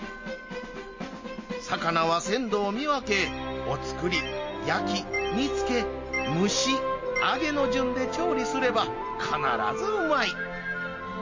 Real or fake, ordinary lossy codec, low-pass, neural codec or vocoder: real; MP3, 32 kbps; 7.2 kHz; none